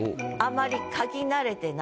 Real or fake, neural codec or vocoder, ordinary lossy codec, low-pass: real; none; none; none